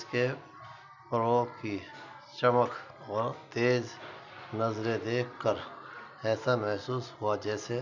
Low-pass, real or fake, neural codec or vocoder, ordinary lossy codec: 7.2 kHz; real; none; none